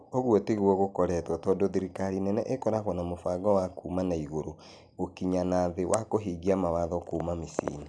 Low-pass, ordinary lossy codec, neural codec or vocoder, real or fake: 9.9 kHz; none; none; real